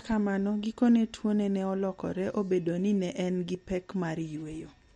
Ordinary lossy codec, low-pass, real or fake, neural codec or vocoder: MP3, 48 kbps; 19.8 kHz; real; none